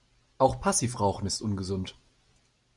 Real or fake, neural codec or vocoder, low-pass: real; none; 10.8 kHz